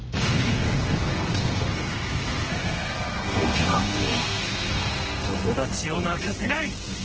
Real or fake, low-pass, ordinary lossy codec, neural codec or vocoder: fake; 7.2 kHz; Opus, 16 kbps; codec, 32 kHz, 1.9 kbps, SNAC